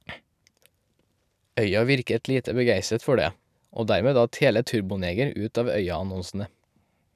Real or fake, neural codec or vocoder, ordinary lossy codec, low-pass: real; none; none; 14.4 kHz